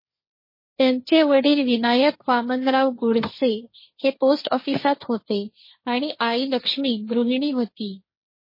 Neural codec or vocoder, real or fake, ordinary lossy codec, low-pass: codec, 16 kHz, 1.1 kbps, Voila-Tokenizer; fake; MP3, 24 kbps; 5.4 kHz